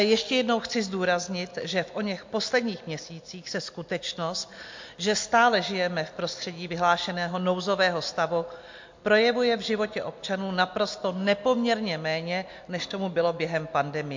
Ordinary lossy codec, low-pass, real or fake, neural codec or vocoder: MP3, 48 kbps; 7.2 kHz; real; none